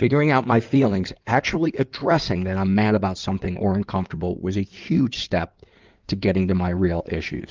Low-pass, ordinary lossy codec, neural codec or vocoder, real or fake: 7.2 kHz; Opus, 24 kbps; codec, 16 kHz in and 24 kHz out, 2.2 kbps, FireRedTTS-2 codec; fake